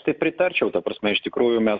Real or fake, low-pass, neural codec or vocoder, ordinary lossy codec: real; 7.2 kHz; none; Opus, 64 kbps